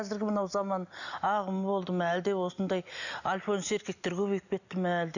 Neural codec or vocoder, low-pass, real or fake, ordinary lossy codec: none; 7.2 kHz; real; none